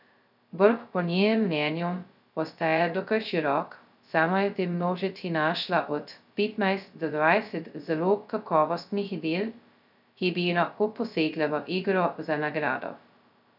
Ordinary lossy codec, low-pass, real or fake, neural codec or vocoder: none; 5.4 kHz; fake; codec, 16 kHz, 0.2 kbps, FocalCodec